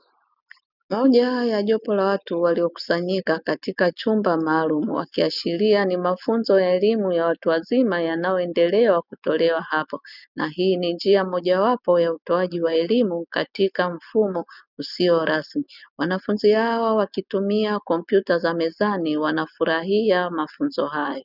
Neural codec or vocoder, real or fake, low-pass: none; real; 5.4 kHz